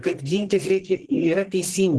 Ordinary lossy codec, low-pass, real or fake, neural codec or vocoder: Opus, 16 kbps; 10.8 kHz; fake; codec, 44.1 kHz, 1.7 kbps, Pupu-Codec